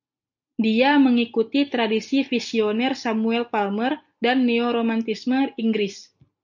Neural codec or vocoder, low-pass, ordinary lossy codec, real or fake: none; 7.2 kHz; MP3, 64 kbps; real